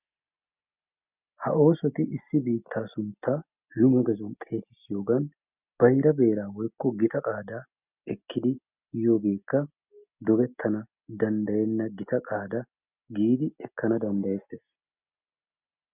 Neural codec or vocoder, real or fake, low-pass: none; real; 3.6 kHz